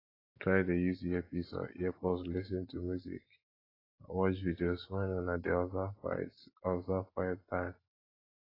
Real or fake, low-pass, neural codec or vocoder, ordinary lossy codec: real; 5.4 kHz; none; AAC, 24 kbps